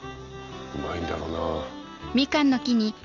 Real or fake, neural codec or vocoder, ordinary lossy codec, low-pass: real; none; none; 7.2 kHz